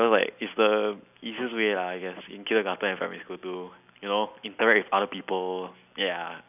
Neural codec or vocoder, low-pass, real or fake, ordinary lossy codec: none; 3.6 kHz; real; none